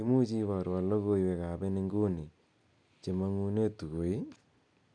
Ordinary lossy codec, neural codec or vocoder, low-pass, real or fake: none; none; 9.9 kHz; real